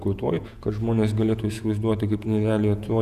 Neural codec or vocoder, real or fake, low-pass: vocoder, 48 kHz, 128 mel bands, Vocos; fake; 14.4 kHz